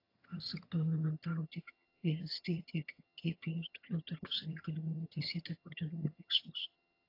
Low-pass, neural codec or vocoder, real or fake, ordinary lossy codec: 5.4 kHz; vocoder, 22.05 kHz, 80 mel bands, HiFi-GAN; fake; AAC, 32 kbps